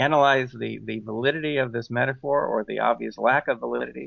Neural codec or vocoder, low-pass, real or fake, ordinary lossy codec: none; 7.2 kHz; real; MP3, 48 kbps